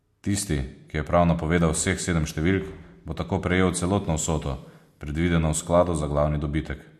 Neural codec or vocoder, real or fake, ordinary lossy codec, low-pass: none; real; MP3, 64 kbps; 14.4 kHz